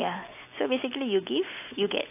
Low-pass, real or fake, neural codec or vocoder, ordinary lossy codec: 3.6 kHz; real; none; AAC, 32 kbps